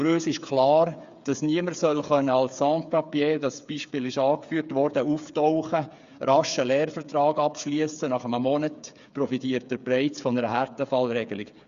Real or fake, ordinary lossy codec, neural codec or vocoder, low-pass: fake; Opus, 64 kbps; codec, 16 kHz, 8 kbps, FreqCodec, smaller model; 7.2 kHz